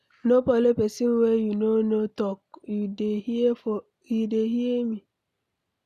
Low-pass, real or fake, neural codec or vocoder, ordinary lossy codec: 14.4 kHz; real; none; none